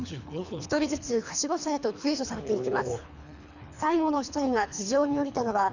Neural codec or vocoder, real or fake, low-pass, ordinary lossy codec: codec, 24 kHz, 3 kbps, HILCodec; fake; 7.2 kHz; none